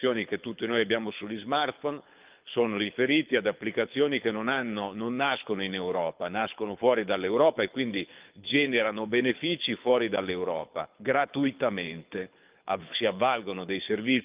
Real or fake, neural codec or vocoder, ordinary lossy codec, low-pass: fake; codec, 24 kHz, 6 kbps, HILCodec; Opus, 24 kbps; 3.6 kHz